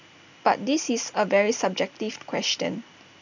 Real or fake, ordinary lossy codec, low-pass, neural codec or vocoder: real; none; 7.2 kHz; none